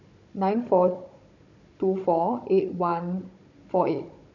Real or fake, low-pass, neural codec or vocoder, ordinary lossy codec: fake; 7.2 kHz; codec, 16 kHz, 16 kbps, FunCodec, trained on Chinese and English, 50 frames a second; none